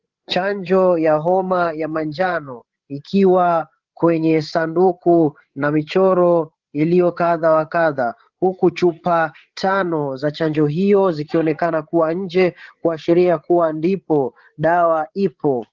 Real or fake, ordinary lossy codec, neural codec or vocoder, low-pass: fake; Opus, 16 kbps; codec, 16 kHz, 8 kbps, FreqCodec, larger model; 7.2 kHz